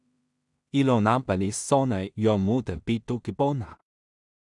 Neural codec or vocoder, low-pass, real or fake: codec, 16 kHz in and 24 kHz out, 0.4 kbps, LongCat-Audio-Codec, two codebook decoder; 10.8 kHz; fake